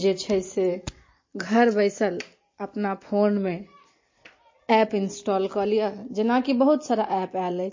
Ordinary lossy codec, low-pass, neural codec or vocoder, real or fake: MP3, 32 kbps; 7.2 kHz; none; real